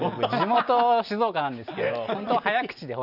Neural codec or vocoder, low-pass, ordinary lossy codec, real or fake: none; 5.4 kHz; none; real